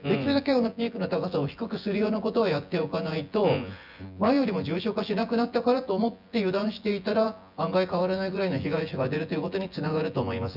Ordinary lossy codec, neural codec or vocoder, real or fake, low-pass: none; vocoder, 24 kHz, 100 mel bands, Vocos; fake; 5.4 kHz